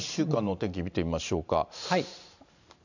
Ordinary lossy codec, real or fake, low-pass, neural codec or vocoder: none; fake; 7.2 kHz; vocoder, 44.1 kHz, 128 mel bands every 512 samples, BigVGAN v2